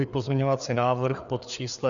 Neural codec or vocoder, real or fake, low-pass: codec, 16 kHz, 4 kbps, FreqCodec, larger model; fake; 7.2 kHz